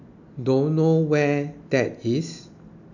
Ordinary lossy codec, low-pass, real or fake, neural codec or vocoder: none; 7.2 kHz; real; none